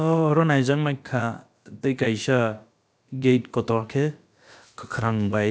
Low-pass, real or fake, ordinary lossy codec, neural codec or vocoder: none; fake; none; codec, 16 kHz, about 1 kbps, DyCAST, with the encoder's durations